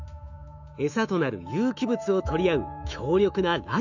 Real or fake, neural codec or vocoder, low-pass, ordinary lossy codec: fake; autoencoder, 48 kHz, 128 numbers a frame, DAC-VAE, trained on Japanese speech; 7.2 kHz; none